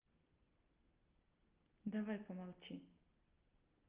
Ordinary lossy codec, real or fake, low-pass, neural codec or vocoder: Opus, 32 kbps; real; 3.6 kHz; none